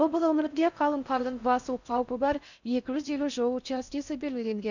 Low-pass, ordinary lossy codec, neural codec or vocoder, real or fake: 7.2 kHz; none; codec, 16 kHz in and 24 kHz out, 0.6 kbps, FocalCodec, streaming, 4096 codes; fake